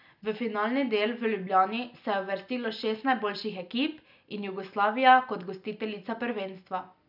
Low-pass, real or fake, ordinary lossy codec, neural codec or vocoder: 5.4 kHz; real; none; none